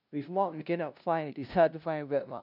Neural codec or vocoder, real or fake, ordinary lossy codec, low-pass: codec, 16 kHz, 0.5 kbps, FunCodec, trained on LibriTTS, 25 frames a second; fake; none; 5.4 kHz